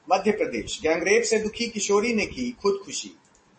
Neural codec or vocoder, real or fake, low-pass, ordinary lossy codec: none; real; 10.8 kHz; MP3, 32 kbps